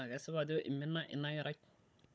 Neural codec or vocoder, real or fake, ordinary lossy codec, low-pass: codec, 16 kHz, 16 kbps, FunCodec, trained on Chinese and English, 50 frames a second; fake; none; none